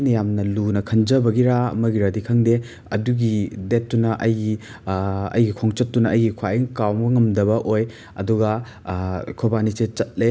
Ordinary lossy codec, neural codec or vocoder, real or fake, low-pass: none; none; real; none